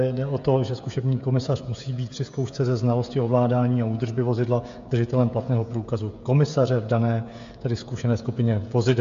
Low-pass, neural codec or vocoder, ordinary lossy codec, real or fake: 7.2 kHz; codec, 16 kHz, 16 kbps, FreqCodec, smaller model; MP3, 48 kbps; fake